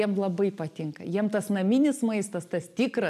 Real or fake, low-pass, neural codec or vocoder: real; 14.4 kHz; none